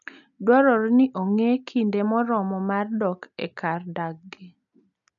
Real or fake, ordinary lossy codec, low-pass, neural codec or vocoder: real; none; 7.2 kHz; none